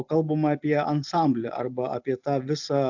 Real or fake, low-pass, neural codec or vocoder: real; 7.2 kHz; none